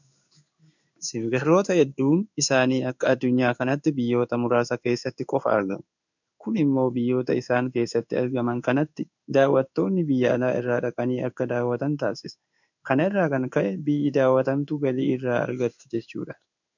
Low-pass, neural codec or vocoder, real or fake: 7.2 kHz; codec, 16 kHz in and 24 kHz out, 1 kbps, XY-Tokenizer; fake